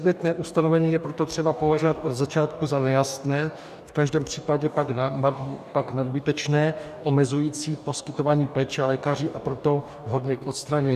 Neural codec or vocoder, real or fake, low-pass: codec, 44.1 kHz, 2.6 kbps, DAC; fake; 14.4 kHz